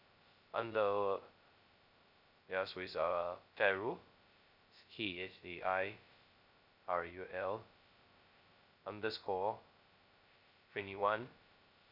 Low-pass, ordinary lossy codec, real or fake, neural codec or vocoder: 5.4 kHz; none; fake; codec, 16 kHz, 0.2 kbps, FocalCodec